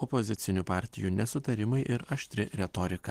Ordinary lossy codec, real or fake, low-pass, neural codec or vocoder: Opus, 16 kbps; real; 14.4 kHz; none